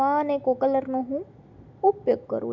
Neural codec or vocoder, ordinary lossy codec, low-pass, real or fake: none; none; 7.2 kHz; real